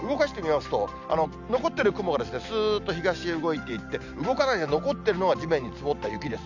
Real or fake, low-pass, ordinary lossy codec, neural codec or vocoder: real; 7.2 kHz; none; none